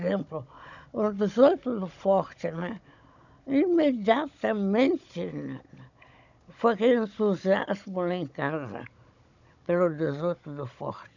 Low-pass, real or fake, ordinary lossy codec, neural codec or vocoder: 7.2 kHz; fake; none; codec, 16 kHz, 16 kbps, FunCodec, trained on Chinese and English, 50 frames a second